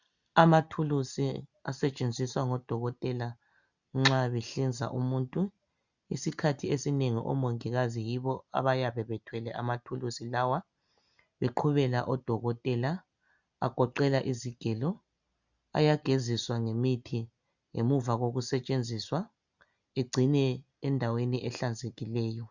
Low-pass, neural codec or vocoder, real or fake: 7.2 kHz; none; real